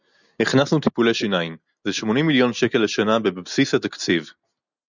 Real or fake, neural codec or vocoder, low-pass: real; none; 7.2 kHz